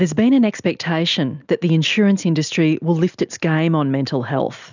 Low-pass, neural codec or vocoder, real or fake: 7.2 kHz; none; real